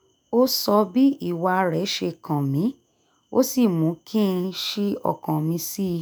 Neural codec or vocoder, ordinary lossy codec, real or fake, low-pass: autoencoder, 48 kHz, 128 numbers a frame, DAC-VAE, trained on Japanese speech; none; fake; none